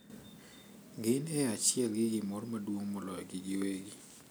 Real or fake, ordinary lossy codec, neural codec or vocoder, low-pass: real; none; none; none